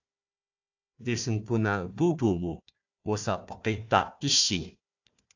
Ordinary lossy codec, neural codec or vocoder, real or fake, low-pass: AAC, 48 kbps; codec, 16 kHz, 1 kbps, FunCodec, trained on Chinese and English, 50 frames a second; fake; 7.2 kHz